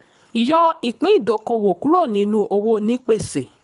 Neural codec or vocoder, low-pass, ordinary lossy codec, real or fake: codec, 24 kHz, 3 kbps, HILCodec; 10.8 kHz; MP3, 96 kbps; fake